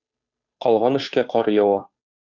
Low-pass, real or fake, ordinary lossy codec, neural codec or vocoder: 7.2 kHz; fake; Opus, 64 kbps; codec, 16 kHz, 2 kbps, FunCodec, trained on Chinese and English, 25 frames a second